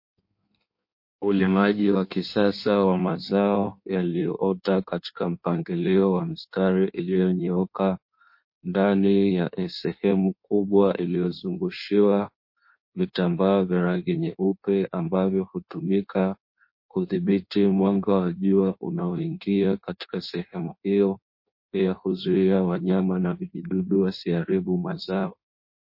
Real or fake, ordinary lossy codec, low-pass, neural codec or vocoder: fake; MP3, 32 kbps; 5.4 kHz; codec, 16 kHz in and 24 kHz out, 1.1 kbps, FireRedTTS-2 codec